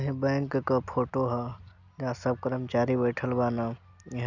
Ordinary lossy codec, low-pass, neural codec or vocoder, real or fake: none; 7.2 kHz; none; real